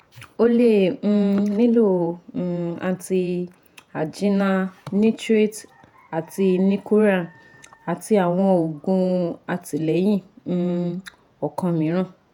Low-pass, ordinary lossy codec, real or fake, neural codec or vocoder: 19.8 kHz; none; fake; vocoder, 48 kHz, 128 mel bands, Vocos